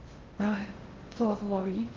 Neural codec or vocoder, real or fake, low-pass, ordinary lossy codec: codec, 16 kHz in and 24 kHz out, 0.6 kbps, FocalCodec, streaming, 2048 codes; fake; 7.2 kHz; Opus, 16 kbps